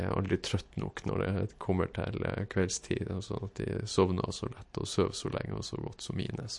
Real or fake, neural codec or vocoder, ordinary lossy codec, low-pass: real; none; MP3, 48 kbps; 10.8 kHz